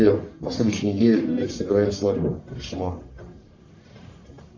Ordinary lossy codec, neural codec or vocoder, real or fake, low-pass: AAC, 48 kbps; codec, 44.1 kHz, 1.7 kbps, Pupu-Codec; fake; 7.2 kHz